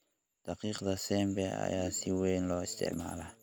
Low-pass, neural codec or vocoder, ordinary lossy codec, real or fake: none; none; none; real